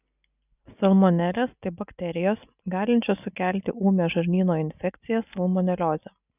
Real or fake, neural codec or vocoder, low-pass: real; none; 3.6 kHz